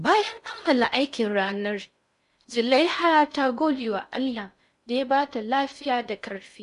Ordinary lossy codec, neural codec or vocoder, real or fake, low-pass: none; codec, 16 kHz in and 24 kHz out, 0.6 kbps, FocalCodec, streaming, 4096 codes; fake; 10.8 kHz